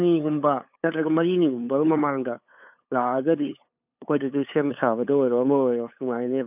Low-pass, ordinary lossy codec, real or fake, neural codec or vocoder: 3.6 kHz; none; fake; codec, 16 kHz, 8 kbps, FunCodec, trained on LibriTTS, 25 frames a second